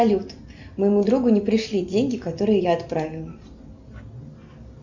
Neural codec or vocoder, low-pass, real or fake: none; 7.2 kHz; real